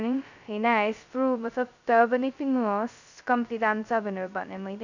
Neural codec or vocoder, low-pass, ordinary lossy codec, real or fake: codec, 16 kHz, 0.2 kbps, FocalCodec; 7.2 kHz; none; fake